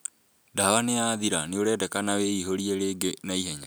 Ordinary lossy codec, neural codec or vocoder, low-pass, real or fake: none; none; none; real